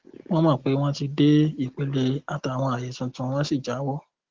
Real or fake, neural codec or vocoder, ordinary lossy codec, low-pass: real; none; Opus, 16 kbps; 7.2 kHz